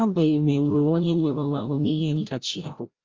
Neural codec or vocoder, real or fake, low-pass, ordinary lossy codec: codec, 16 kHz, 0.5 kbps, FreqCodec, larger model; fake; 7.2 kHz; Opus, 32 kbps